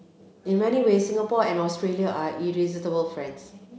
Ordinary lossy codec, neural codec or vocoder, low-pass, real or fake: none; none; none; real